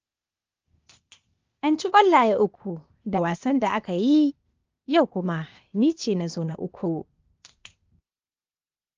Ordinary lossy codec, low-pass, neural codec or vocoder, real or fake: Opus, 24 kbps; 7.2 kHz; codec, 16 kHz, 0.8 kbps, ZipCodec; fake